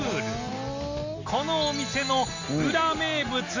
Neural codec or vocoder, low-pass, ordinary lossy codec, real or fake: none; 7.2 kHz; AAC, 48 kbps; real